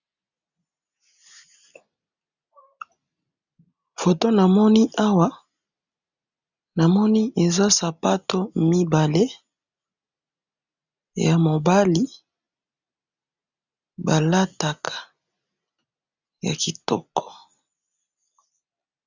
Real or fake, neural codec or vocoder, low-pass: real; none; 7.2 kHz